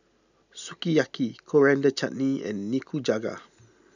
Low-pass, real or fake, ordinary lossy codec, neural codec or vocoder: 7.2 kHz; real; none; none